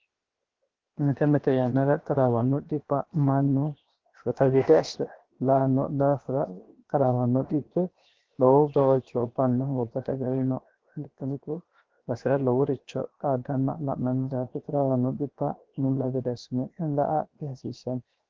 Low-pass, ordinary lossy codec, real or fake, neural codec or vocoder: 7.2 kHz; Opus, 16 kbps; fake; codec, 16 kHz, 0.7 kbps, FocalCodec